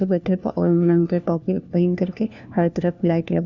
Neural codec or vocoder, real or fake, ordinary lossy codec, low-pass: codec, 16 kHz, 1 kbps, FunCodec, trained on LibriTTS, 50 frames a second; fake; none; 7.2 kHz